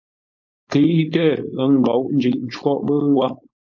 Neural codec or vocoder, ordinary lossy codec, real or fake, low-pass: codec, 16 kHz, 4.8 kbps, FACodec; MP3, 48 kbps; fake; 7.2 kHz